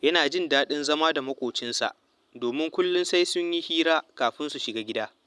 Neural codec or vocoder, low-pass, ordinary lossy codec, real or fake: none; none; none; real